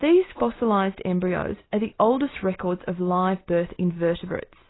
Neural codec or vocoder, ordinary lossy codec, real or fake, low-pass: none; AAC, 16 kbps; real; 7.2 kHz